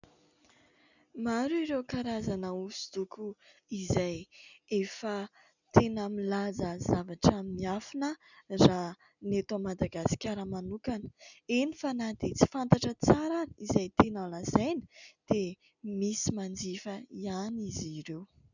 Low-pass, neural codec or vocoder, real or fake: 7.2 kHz; none; real